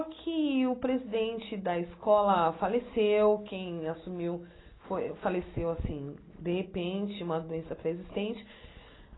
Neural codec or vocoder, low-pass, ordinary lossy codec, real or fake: none; 7.2 kHz; AAC, 16 kbps; real